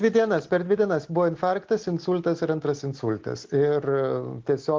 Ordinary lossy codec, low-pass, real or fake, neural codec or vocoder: Opus, 16 kbps; 7.2 kHz; real; none